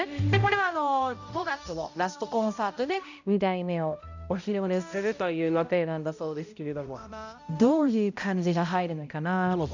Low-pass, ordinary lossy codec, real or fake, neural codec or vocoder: 7.2 kHz; none; fake; codec, 16 kHz, 0.5 kbps, X-Codec, HuBERT features, trained on balanced general audio